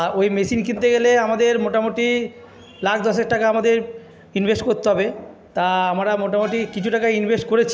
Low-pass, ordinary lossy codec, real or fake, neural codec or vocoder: none; none; real; none